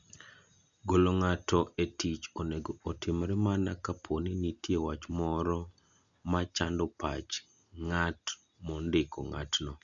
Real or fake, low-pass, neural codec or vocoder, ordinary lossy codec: real; 7.2 kHz; none; none